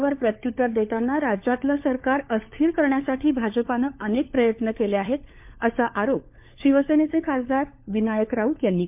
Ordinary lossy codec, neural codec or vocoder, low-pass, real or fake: MP3, 32 kbps; codec, 16 kHz, 4 kbps, FunCodec, trained on LibriTTS, 50 frames a second; 3.6 kHz; fake